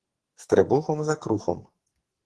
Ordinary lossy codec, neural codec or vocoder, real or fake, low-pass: Opus, 16 kbps; codec, 44.1 kHz, 2.6 kbps, SNAC; fake; 10.8 kHz